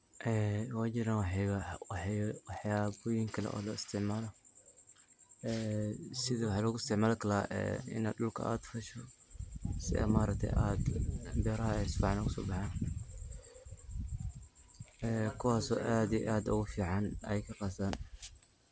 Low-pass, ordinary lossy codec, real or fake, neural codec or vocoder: none; none; real; none